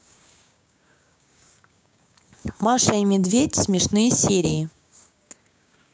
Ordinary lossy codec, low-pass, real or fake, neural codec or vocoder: none; none; fake; codec, 16 kHz, 6 kbps, DAC